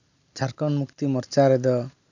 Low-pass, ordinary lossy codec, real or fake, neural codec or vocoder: 7.2 kHz; none; real; none